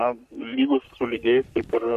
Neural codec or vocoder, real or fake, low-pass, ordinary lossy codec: codec, 44.1 kHz, 3.4 kbps, Pupu-Codec; fake; 14.4 kHz; MP3, 64 kbps